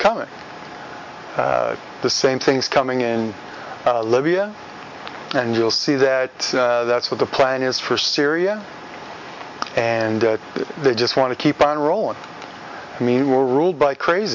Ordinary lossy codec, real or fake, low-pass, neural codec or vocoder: MP3, 48 kbps; real; 7.2 kHz; none